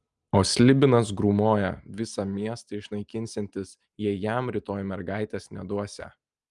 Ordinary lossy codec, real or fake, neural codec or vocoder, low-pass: Opus, 32 kbps; real; none; 10.8 kHz